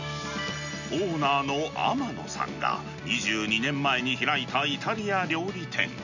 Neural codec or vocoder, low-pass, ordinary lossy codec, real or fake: none; 7.2 kHz; none; real